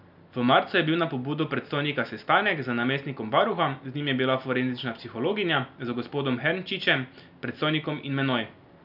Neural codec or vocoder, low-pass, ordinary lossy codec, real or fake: none; 5.4 kHz; none; real